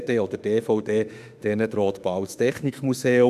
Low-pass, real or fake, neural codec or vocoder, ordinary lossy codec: 14.4 kHz; fake; autoencoder, 48 kHz, 32 numbers a frame, DAC-VAE, trained on Japanese speech; none